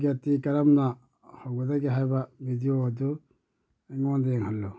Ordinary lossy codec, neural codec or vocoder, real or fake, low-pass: none; none; real; none